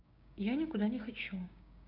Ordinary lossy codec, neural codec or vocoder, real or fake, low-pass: none; codec, 16 kHz, 6 kbps, DAC; fake; 5.4 kHz